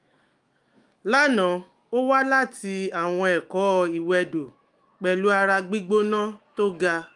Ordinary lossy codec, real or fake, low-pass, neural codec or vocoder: Opus, 32 kbps; fake; 10.8 kHz; autoencoder, 48 kHz, 128 numbers a frame, DAC-VAE, trained on Japanese speech